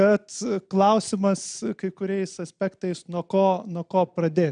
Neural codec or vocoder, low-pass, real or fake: none; 9.9 kHz; real